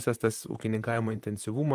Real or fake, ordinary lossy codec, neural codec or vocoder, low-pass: fake; Opus, 32 kbps; vocoder, 44.1 kHz, 128 mel bands, Pupu-Vocoder; 14.4 kHz